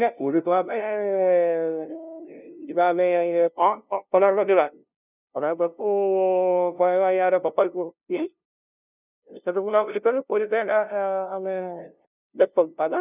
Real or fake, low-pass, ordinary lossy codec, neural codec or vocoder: fake; 3.6 kHz; none; codec, 16 kHz, 0.5 kbps, FunCodec, trained on LibriTTS, 25 frames a second